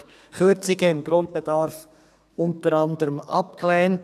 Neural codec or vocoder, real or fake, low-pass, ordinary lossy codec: codec, 32 kHz, 1.9 kbps, SNAC; fake; 14.4 kHz; none